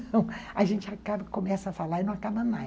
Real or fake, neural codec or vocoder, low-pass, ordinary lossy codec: real; none; none; none